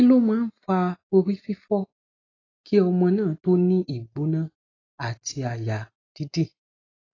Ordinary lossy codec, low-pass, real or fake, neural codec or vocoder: AAC, 32 kbps; 7.2 kHz; real; none